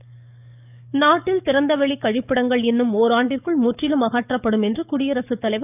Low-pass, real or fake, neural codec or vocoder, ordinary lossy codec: 3.6 kHz; fake; codec, 44.1 kHz, 7.8 kbps, DAC; none